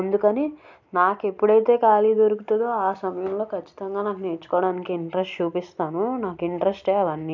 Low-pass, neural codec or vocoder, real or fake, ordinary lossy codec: 7.2 kHz; none; real; none